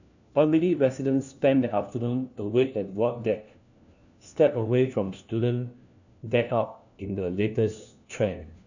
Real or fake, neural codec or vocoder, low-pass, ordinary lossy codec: fake; codec, 16 kHz, 1 kbps, FunCodec, trained on LibriTTS, 50 frames a second; 7.2 kHz; none